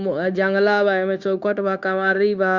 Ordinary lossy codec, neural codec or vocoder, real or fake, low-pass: Opus, 64 kbps; codec, 16 kHz, 0.9 kbps, LongCat-Audio-Codec; fake; 7.2 kHz